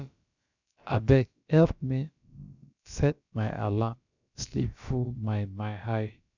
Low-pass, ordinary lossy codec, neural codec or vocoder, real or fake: 7.2 kHz; none; codec, 16 kHz, about 1 kbps, DyCAST, with the encoder's durations; fake